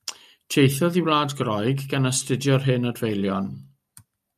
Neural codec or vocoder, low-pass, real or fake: none; 14.4 kHz; real